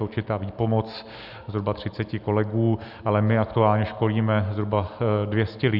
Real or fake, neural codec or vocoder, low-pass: real; none; 5.4 kHz